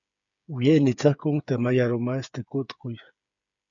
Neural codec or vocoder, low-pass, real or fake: codec, 16 kHz, 8 kbps, FreqCodec, smaller model; 7.2 kHz; fake